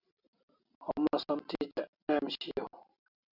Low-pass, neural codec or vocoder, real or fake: 5.4 kHz; none; real